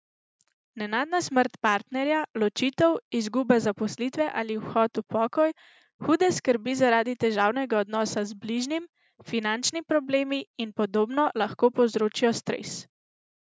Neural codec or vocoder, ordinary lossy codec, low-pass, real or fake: none; none; none; real